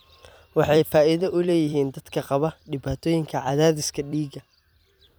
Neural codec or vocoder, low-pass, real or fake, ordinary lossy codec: vocoder, 44.1 kHz, 128 mel bands every 256 samples, BigVGAN v2; none; fake; none